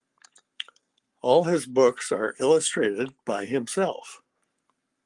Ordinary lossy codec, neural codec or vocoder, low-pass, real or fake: Opus, 32 kbps; codec, 44.1 kHz, 7.8 kbps, Pupu-Codec; 10.8 kHz; fake